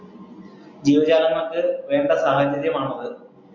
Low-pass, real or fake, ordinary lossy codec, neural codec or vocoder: 7.2 kHz; real; MP3, 48 kbps; none